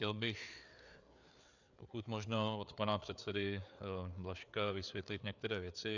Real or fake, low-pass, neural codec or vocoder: fake; 7.2 kHz; codec, 16 kHz, 4 kbps, FreqCodec, larger model